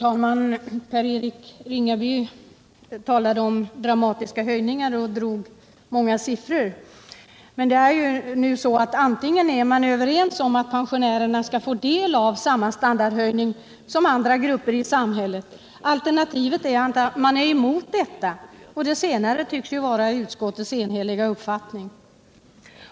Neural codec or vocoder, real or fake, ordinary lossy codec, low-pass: none; real; none; none